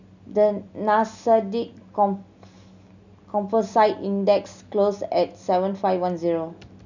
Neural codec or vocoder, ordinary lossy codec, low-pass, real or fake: none; none; 7.2 kHz; real